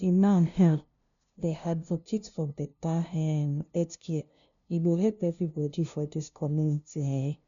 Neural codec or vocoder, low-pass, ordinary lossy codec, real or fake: codec, 16 kHz, 0.5 kbps, FunCodec, trained on LibriTTS, 25 frames a second; 7.2 kHz; none; fake